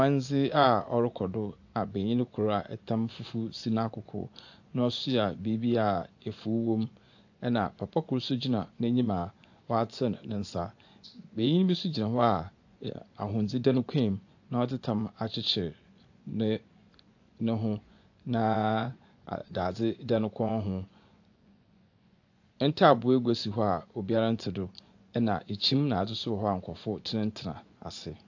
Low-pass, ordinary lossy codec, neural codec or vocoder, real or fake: 7.2 kHz; AAC, 48 kbps; vocoder, 24 kHz, 100 mel bands, Vocos; fake